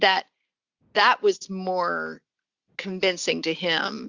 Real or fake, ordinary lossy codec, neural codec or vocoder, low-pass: real; Opus, 64 kbps; none; 7.2 kHz